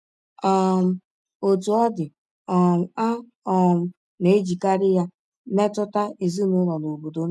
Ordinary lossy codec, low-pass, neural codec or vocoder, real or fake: none; none; none; real